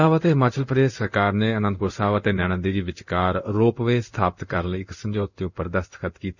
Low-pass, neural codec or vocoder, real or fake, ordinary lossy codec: 7.2 kHz; codec, 16 kHz in and 24 kHz out, 1 kbps, XY-Tokenizer; fake; none